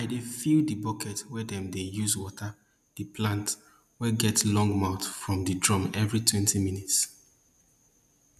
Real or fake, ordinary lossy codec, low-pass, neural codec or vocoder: real; none; 14.4 kHz; none